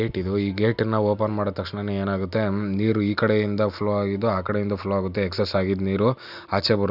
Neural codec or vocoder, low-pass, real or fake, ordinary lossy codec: none; 5.4 kHz; real; none